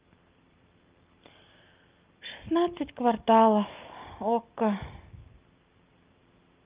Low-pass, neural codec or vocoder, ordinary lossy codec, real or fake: 3.6 kHz; none; Opus, 32 kbps; real